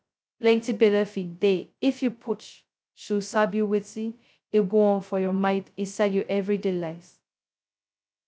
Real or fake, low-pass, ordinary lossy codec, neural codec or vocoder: fake; none; none; codec, 16 kHz, 0.2 kbps, FocalCodec